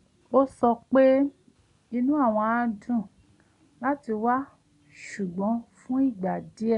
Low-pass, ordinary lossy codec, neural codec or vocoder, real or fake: 10.8 kHz; none; none; real